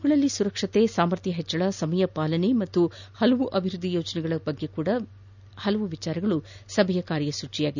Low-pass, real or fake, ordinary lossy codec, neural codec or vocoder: 7.2 kHz; real; none; none